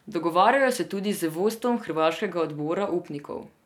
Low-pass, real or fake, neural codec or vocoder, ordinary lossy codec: 19.8 kHz; real; none; none